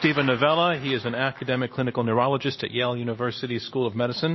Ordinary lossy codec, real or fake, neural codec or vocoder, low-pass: MP3, 24 kbps; real; none; 7.2 kHz